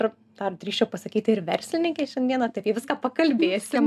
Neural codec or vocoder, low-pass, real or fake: none; 14.4 kHz; real